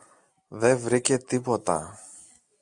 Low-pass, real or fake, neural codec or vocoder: 10.8 kHz; real; none